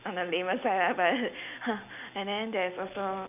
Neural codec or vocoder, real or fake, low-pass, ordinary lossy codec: none; real; 3.6 kHz; none